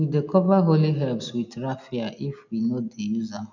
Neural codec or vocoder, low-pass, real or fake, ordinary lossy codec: none; 7.2 kHz; real; none